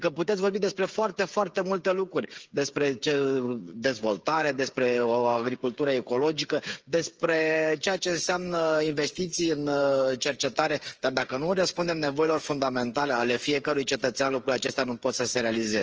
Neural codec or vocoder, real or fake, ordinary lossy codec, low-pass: codec, 16 kHz, 8 kbps, FreqCodec, smaller model; fake; Opus, 24 kbps; 7.2 kHz